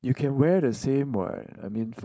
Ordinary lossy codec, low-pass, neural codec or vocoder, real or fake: none; none; codec, 16 kHz, 4.8 kbps, FACodec; fake